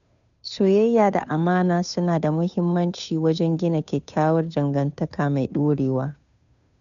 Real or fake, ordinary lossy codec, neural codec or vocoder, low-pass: fake; none; codec, 16 kHz, 2 kbps, FunCodec, trained on Chinese and English, 25 frames a second; 7.2 kHz